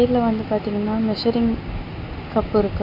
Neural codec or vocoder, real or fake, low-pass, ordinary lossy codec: none; real; 5.4 kHz; MP3, 32 kbps